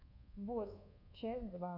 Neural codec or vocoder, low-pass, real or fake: codec, 24 kHz, 1.2 kbps, DualCodec; 5.4 kHz; fake